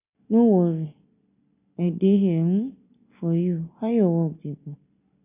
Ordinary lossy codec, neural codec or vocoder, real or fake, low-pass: none; none; real; 3.6 kHz